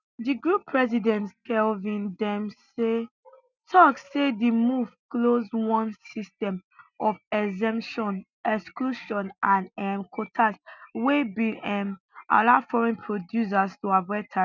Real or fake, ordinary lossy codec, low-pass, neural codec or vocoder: real; none; 7.2 kHz; none